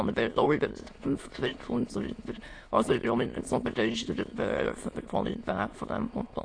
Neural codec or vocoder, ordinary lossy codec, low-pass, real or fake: autoencoder, 22.05 kHz, a latent of 192 numbers a frame, VITS, trained on many speakers; AAC, 48 kbps; 9.9 kHz; fake